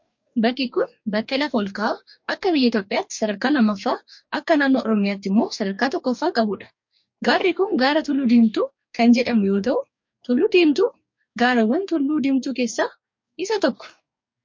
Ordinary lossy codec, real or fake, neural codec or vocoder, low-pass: MP3, 48 kbps; fake; codec, 44.1 kHz, 2.6 kbps, DAC; 7.2 kHz